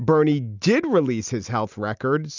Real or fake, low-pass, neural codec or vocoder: real; 7.2 kHz; none